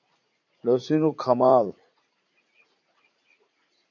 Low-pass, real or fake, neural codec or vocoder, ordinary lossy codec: 7.2 kHz; fake; vocoder, 44.1 kHz, 80 mel bands, Vocos; AAC, 48 kbps